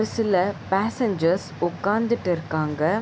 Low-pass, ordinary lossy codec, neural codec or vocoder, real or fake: none; none; none; real